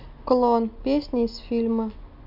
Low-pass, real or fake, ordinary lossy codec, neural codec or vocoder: 5.4 kHz; real; none; none